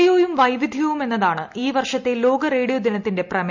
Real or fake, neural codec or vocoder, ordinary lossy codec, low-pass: real; none; MP3, 64 kbps; 7.2 kHz